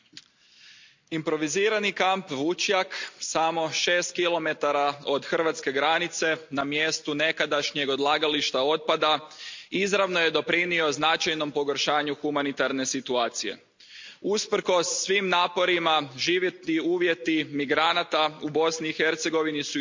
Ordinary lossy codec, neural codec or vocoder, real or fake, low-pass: MP3, 64 kbps; none; real; 7.2 kHz